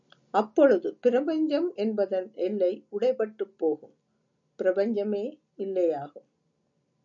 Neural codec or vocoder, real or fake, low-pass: none; real; 7.2 kHz